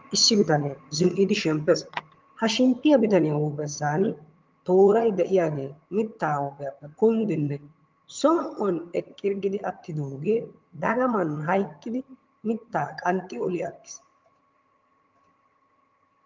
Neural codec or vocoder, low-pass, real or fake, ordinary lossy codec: vocoder, 22.05 kHz, 80 mel bands, HiFi-GAN; 7.2 kHz; fake; Opus, 32 kbps